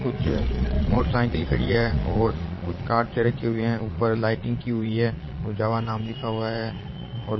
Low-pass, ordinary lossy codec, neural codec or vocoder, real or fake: 7.2 kHz; MP3, 24 kbps; vocoder, 22.05 kHz, 80 mel bands, Vocos; fake